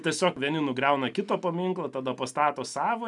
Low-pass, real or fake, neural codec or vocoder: 10.8 kHz; real; none